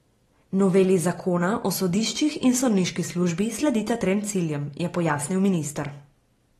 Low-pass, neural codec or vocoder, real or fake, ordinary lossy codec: 19.8 kHz; none; real; AAC, 32 kbps